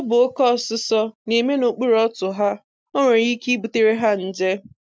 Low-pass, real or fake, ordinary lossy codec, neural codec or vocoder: none; real; none; none